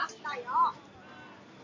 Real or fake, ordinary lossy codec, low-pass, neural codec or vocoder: real; AAC, 32 kbps; 7.2 kHz; none